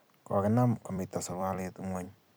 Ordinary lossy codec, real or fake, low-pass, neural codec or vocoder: none; fake; none; vocoder, 44.1 kHz, 128 mel bands every 256 samples, BigVGAN v2